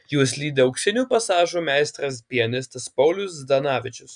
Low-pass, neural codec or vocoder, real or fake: 9.9 kHz; none; real